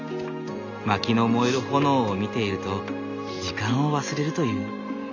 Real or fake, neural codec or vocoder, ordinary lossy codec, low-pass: real; none; none; 7.2 kHz